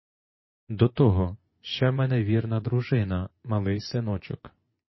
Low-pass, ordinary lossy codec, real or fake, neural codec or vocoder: 7.2 kHz; MP3, 24 kbps; fake; vocoder, 24 kHz, 100 mel bands, Vocos